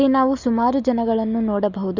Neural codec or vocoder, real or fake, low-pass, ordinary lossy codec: none; real; 7.2 kHz; Opus, 64 kbps